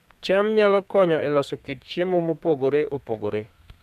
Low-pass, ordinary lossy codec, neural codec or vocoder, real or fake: 14.4 kHz; none; codec, 32 kHz, 1.9 kbps, SNAC; fake